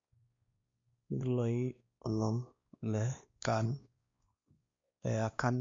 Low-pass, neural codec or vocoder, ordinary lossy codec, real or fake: 7.2 kHz; codec, 16 kHz, 2 kbps, X-Codec, WavLM features, trained on Multilingual LibriSpeech; MP3, 48 kbps; fake